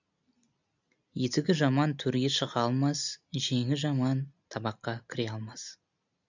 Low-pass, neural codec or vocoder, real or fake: 7.2 kHz; none; real